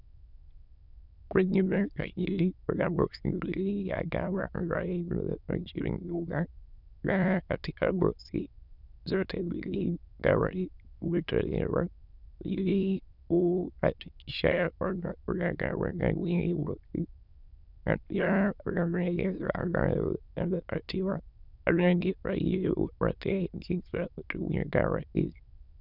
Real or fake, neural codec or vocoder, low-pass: fake; autoencoder, 22.05 kHz, a latent of 192 numbers a frame, VITS, trained on many speakers; 5.4 kHz